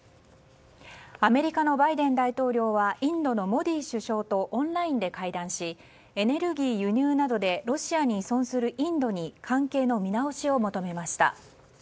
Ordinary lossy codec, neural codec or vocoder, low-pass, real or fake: none; none; none; real